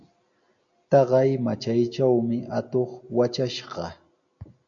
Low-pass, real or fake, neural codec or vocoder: 7.2 kHz; real; none